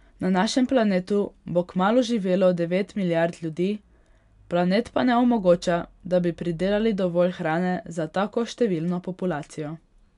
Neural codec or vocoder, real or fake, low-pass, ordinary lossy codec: none; real; 10.8 kHz; none